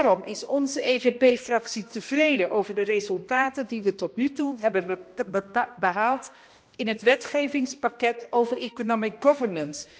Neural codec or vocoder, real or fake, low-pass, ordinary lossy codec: codec, 16 kHz, 1 kbps, X-Codec, HuBERT features, trained on balanced general audio; fake; none; none